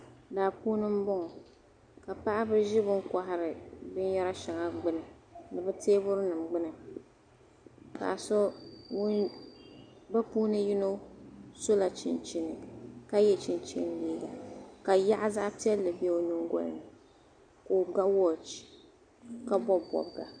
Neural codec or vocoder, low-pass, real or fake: none; 9.9 kHz; real